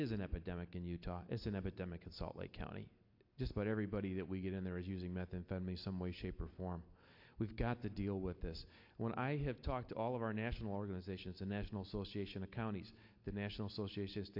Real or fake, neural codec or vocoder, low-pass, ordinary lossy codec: real; none; 5.4 kHz; AAC, 48 kbps